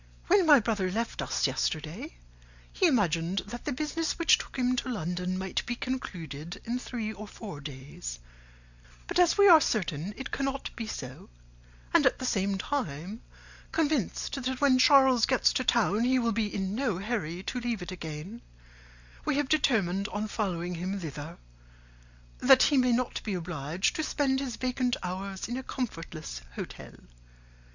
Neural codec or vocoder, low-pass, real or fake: none; 7.2 kHz; real